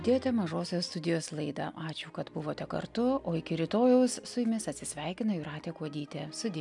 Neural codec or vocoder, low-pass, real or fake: none; 10.8 kHz; real